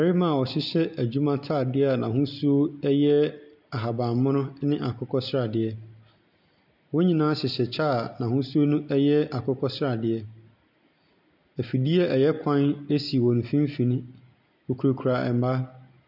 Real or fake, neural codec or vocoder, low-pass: real; none; 5.4 kHz